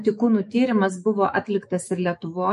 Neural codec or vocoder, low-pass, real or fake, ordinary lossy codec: autoencoder, 48 kHz, 128 numbers a frame, DAC-VAE, trained on Japanese speech; 14.4 kHz; fake; MP3, 48 kbps